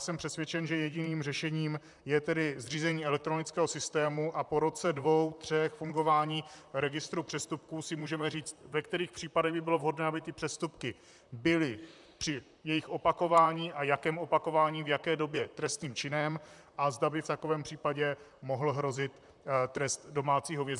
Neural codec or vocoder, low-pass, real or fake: vocoder, 44.1 kHz, 128 mel bands, Pupu-Vocoder; 10.8 kHz; fake